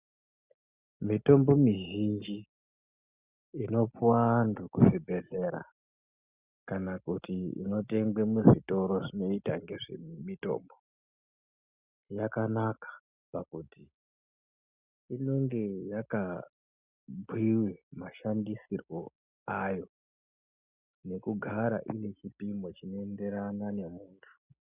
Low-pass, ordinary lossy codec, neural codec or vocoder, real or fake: 3.6 kHz; Opus, 64 kbps; none; real